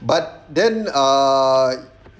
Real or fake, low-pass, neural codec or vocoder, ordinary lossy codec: real; none; none; none